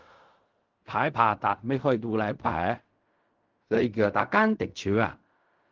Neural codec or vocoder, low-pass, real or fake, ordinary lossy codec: codec, 16 kHz in and 24 kHz out, 0.4 kbps, LongCat-Audio-Codec, fine tuned four codebook decoder; 7.2 kHz; fake; Opus, 32 kbps